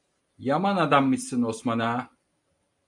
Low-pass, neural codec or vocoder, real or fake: 10.8 kHz; none; real